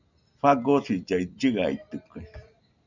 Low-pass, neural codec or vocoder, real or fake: 7.2 kHz; none; real